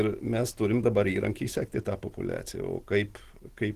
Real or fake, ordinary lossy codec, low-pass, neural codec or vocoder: real; Opus, 16 kbps; 14.4 kHz; none